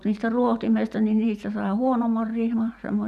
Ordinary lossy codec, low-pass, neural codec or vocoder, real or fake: none; 14.4 kHz; vocoder, 44.1 kHz, 128 mel bands every 512 samples, BigVGAN v2; fake